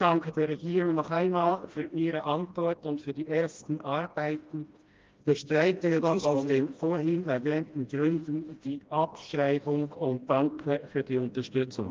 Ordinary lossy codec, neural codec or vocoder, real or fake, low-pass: Opus, 24 kbps; codec, 16 kHz, 1 kbps, FreqCodec, smaller model; fake; 7.2 kHz